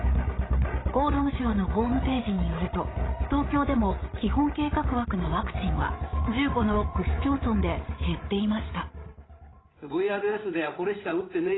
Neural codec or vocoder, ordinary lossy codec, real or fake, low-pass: codec, 16 kHz, 8 kbps, FreqCodec, larger model; AAC, 16 kbps; fake; 7.2 kHz